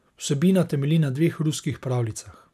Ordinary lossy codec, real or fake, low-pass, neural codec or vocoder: none; real; 14.4 kHz; none